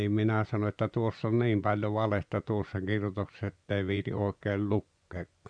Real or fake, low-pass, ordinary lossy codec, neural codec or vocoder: fake; 9.9 kHz; none; vocoder, 24 kHz, 100 mel bands, Vocos